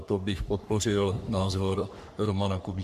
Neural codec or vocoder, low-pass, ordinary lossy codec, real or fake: codec, 44.1 kHz, 3.4 kbps, Pupu-Codec; 14.4 kHz; AAC, 96 kbps; fake